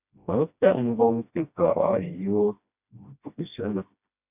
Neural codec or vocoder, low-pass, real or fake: codec, 16 kHz, 1 kbps, FreqCodec, smaller model; 3.6 kHz; fake